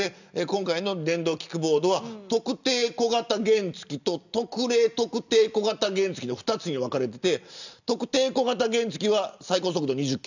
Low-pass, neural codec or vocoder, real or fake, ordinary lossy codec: 7.2 kHz; none; real; none